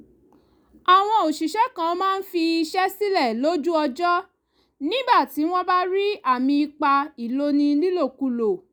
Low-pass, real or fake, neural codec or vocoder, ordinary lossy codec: none; real; none; none